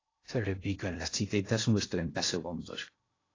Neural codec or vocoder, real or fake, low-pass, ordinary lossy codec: codec, 16 kHz in and 24 kHz out, 0.6 kbps, FocalCodec, streaming, 2048 codes; fake; 7.2 kHz; AAC, 32 kbps